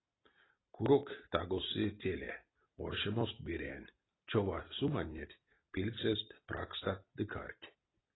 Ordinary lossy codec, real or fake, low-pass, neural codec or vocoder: AAC, 16 kbps; real; 7.2 kHz; none